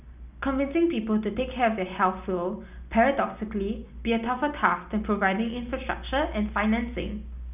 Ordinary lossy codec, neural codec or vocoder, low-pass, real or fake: none; none; 3.6 kHz; real